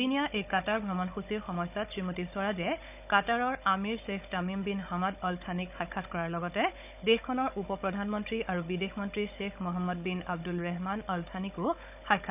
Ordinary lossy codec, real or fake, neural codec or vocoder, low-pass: none; fake; codec, 16 kHz, 16 kbps, FunCodec, trained on Chinese and English, 50 frames a second; 3.6 kHz